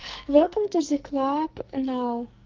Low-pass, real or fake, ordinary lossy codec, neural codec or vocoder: 7.2 kHz; fake; Opus, 32 kbps; codec, 44.1 kHz, 2.6 kbps, SNAC